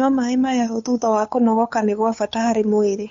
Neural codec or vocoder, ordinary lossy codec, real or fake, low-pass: codec, 16 kHz, 8 kbps, FunCodec, trained on Chinese and English, 25 frames a second; MP3, 48 kbps; fake; 7.2 kHz